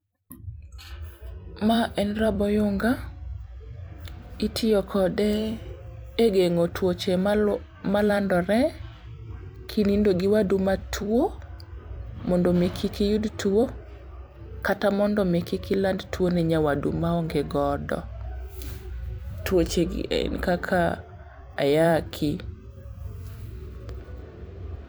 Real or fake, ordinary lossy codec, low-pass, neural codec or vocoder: fake; none; none; vocoder, 44.1 kHz, 128 mel bands every 256 samples, BigVGAN v2